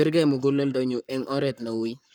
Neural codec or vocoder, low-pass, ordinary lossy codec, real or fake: codec, 44.1 kHz, 7.8 kbps, Pupu-Codec; 19.8 kHz; none; fake